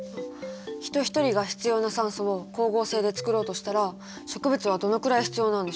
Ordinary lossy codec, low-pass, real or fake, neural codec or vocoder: none; none; real; none